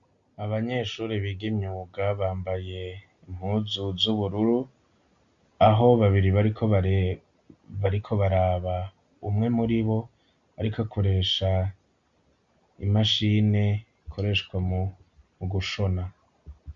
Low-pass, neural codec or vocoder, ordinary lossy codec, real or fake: 7.2 kHz; none; Opus, 64 kbps; real